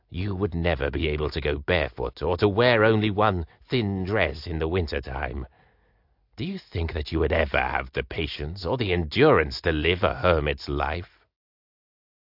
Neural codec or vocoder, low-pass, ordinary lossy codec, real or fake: none; 5.4 kHz; AAC, 48 kbps; real